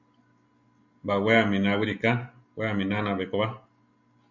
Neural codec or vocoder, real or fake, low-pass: none; real; 7.2 kHz